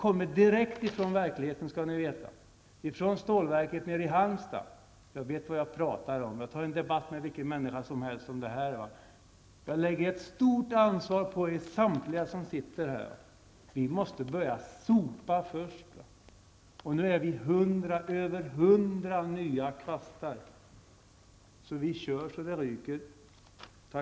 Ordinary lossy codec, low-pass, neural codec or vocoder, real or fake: none; none; none; real